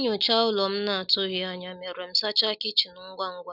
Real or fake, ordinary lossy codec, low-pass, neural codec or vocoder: real; none; 5.4 kHz; none